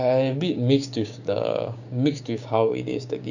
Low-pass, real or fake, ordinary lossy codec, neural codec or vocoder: 7.2 kHz; fake; none; codec, 16 kHz, 6 kbps, DAC